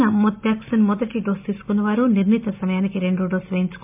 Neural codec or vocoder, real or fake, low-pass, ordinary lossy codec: none; real; 3.6 kHz; MP3, 32 kbps